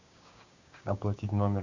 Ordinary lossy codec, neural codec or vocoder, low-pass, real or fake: Opus, 64 kbps; codec, 16 kHz, 6 kbps, DAC; 7.2 kHz; fake